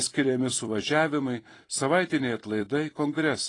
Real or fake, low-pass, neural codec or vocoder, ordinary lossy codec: fake; 10.8 kHz; vocoder, 44.1 kHz, 128 mel bands every 256 samples, BigVGAN v2; AAC, 32 kbps